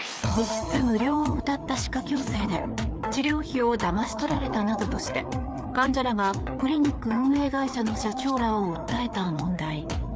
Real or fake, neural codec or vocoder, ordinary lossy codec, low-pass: fake; codec, 16 kHz, 4 kbps, FreqCodec, larger model; none; none